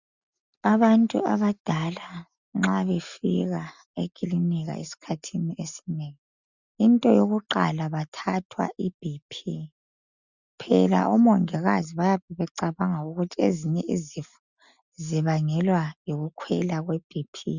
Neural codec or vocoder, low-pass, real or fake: none; 7.2 kHz; real